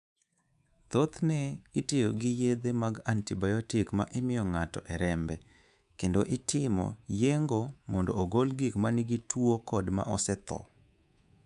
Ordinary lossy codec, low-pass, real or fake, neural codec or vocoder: none; 10.8 kHz; fake; codec, 24 kHz, 3.1 kbps, DualCodec